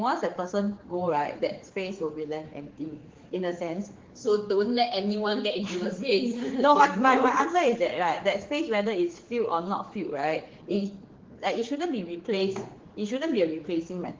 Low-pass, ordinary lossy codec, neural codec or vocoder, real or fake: 7.2 kHz; Opus, 16 kbps; codec, 16 kHz, 4 kbps, X-Codec, HuBERT features, trained on general audio; fake